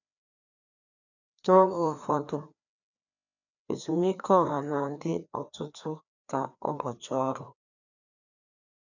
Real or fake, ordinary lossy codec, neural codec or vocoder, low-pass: fake; none; codec, 16 kHz, 2 kbps, FreqCodec, larger model; 7.2 kHz